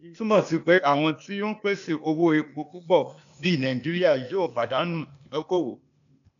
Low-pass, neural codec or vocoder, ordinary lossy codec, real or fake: 7.2 kHz; codec, 16 kHz, 0.8 kbps, ZipCodec; none; fake